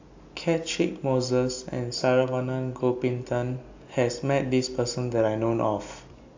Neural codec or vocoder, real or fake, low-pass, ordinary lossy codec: none; real; 7.2 kHz; AAC, 48 kbps